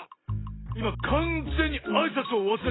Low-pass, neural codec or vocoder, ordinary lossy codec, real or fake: 7.2 kHz; none; AAC, 16 kbps; real